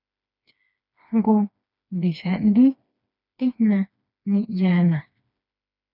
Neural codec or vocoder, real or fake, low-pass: codec, 16 kHz, 2 kbps, FreqCodec, smaller model; fake; 5.4 kHz